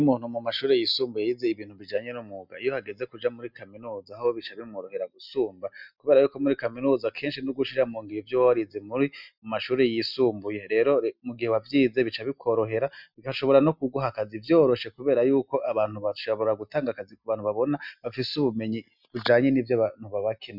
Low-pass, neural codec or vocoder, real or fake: 5.4 kHz; none; real